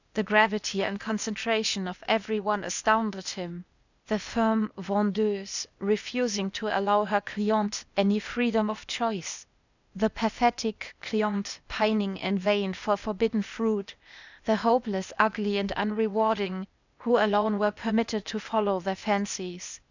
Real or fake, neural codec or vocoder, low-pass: fake; codec, 16 kHz, 0.8 kbps, ZipCodec; 7.2 kHz